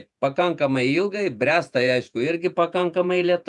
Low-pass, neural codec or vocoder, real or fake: 10.8 kHz; vocoder, 48 kHz, 128 mel bands, Vocos; fake